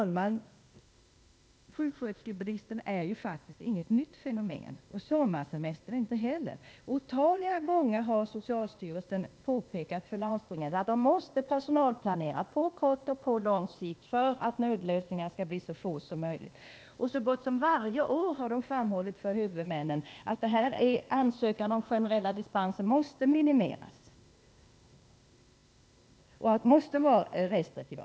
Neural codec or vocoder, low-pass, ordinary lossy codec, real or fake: codec, 16 kHz, 0.8 kbps, ZipCodec; none; none; fake